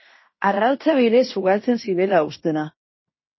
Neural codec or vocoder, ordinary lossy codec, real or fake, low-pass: codec, 16 kHz in and 24 kHz out, 0.9 kbps, LongCat-Audio-Codec, fine tuned four codebook decoder; MP3, 24 kbps; fake; 7.2 kHz